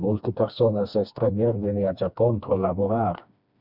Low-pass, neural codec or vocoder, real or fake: 5.4 kHz; codec, 16 kHz, 2 kbps, FreqCodec, smaller model; fake